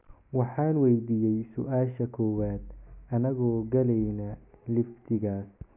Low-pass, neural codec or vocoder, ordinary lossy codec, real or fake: 3.6 kHz; none; none; real